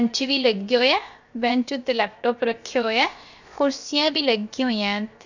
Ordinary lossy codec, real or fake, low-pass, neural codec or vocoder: none; fake; 7.2 kHz; codec, 16 kHz, about 1 kbps, DyCAST, with the encoder's durations